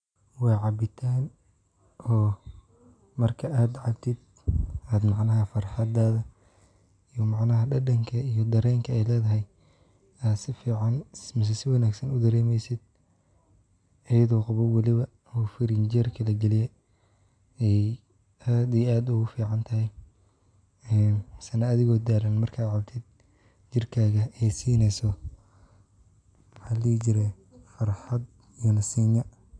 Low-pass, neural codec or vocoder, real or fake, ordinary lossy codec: 9.9 kHz; none; real; none